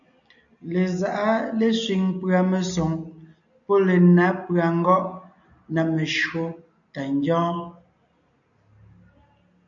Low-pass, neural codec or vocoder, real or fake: 7.2 kHz; none; real